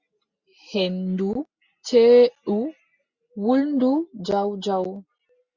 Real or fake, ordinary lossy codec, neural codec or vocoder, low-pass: real; Opus, 64 kbps; none; 7.2 kHz